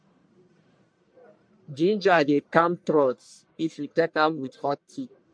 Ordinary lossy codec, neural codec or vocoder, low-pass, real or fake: MP3, 64 kbps; codec, 44.1 kHz, 1.7 kbps, Pupu-Codec; 9.9 kHz; fake